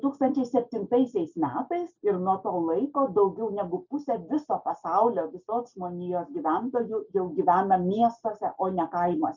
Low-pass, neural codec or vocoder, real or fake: 7.2 kHz; none; real